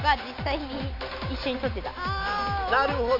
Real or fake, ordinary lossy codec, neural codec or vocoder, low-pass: real; MP3, 32 kbps; none; 5.4 kHz